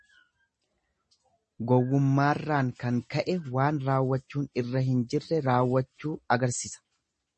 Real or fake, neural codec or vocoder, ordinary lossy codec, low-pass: real; none; MP3, 32 kbps; 9.9 kHz